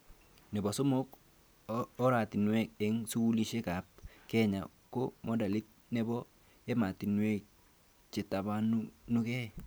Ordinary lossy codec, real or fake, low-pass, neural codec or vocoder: none; real; none; none